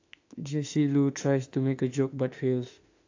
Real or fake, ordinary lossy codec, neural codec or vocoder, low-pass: fake; AAC, 48 kbps; autoencoder, 48 kHz, 32 numbers a frame, DAC-VAE, trained on Japanese speech; 7.2 kHz